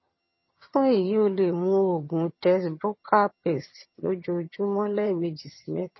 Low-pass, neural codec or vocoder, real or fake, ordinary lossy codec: 7.2 kHz; vocoder, 22.05 kHz, 80 mel bands, HiFi-GAN; fake; MP3, 24 kbps